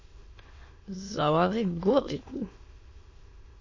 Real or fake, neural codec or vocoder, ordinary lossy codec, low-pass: fake; autoencoder, 22.05 kHz, a latent of 192 numbers a frame, VITS, trained on many speakers; MP3, 32 kbps; 7.2 kHz